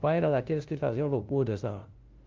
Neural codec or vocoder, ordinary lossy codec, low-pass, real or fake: codec, 16 kHz, 0.5 kbps, FunCodec, trained on LibriTTS, 25 frames a second; Opus, 32 kbps; 7.2 kHz; fake